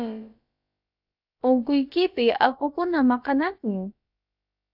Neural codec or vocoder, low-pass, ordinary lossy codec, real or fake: codec, 16 kHz, about 1 kbps, DyCAST, with the encoder's durations; 5.4 kHz; AAC, 48 kbps; fake